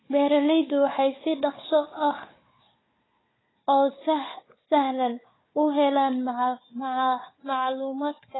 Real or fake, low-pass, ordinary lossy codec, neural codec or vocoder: fake; 7.2 kHz; AAC, 16 kbps; codec, 16 kHz, 4 kbps, FunCodec, trained on Chinese and English, 50 frames a second